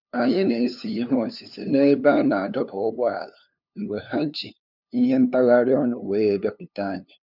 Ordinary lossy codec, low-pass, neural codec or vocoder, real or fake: none; 5.4 kHz; codec, 16 kHz, 2 kbps, FunCodec, trained on LibriTTS, 25 frames a second; fake